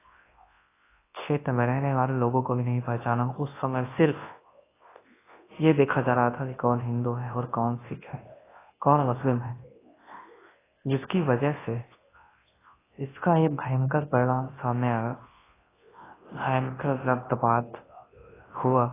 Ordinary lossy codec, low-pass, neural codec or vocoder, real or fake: AAC, 16 kbps; 3.6 kHz; codec, 24 kHz, 0.9 kbps, WavTokenizer, large speech release; fake